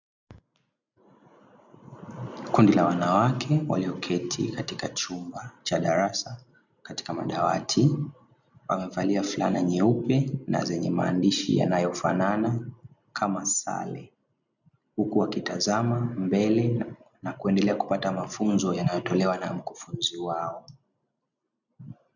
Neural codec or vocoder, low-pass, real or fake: none; 7.2 kHz; real